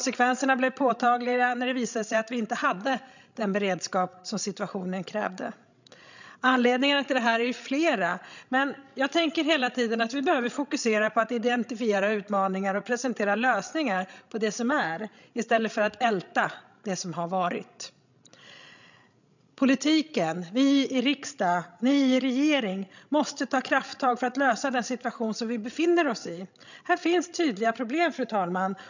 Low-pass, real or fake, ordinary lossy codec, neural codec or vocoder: 7.2 kHz; fake; none; codec, 16 kHz, 16 kbps, FreqCodec, larger model